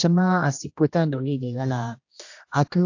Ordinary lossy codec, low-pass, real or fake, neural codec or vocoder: AAC, 48 kbps; 7.2 kHz; fake; codec, 16 kHz, 1 kbps, X-Codec, HuBERT features, trained on general audio